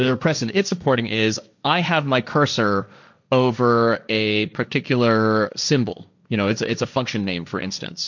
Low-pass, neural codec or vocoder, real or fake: 7.2 kHz; codec, 16 kHz, 1.1 kbps, Voila-Tokenizer; fake